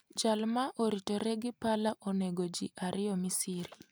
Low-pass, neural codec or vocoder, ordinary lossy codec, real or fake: none; none; none; real